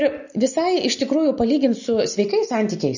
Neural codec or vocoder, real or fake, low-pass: none; real; 7.2 kHz